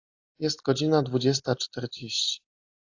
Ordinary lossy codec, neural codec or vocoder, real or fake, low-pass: AAC, 48 kbps; none; real; 7.2 kHz